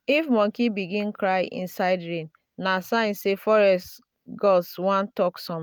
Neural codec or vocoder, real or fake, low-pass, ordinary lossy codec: none; real; none; none